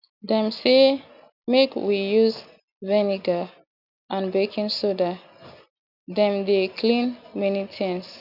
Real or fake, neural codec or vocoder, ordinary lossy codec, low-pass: real; none; none; 5.4 kHz